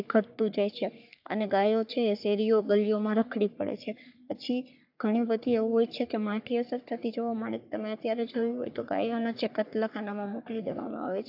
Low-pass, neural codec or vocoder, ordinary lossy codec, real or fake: 5.4 kHz; codec, 44.1 kHz, 3.4 kbps, Pupu-Codec; AAC, 48 kbps; fake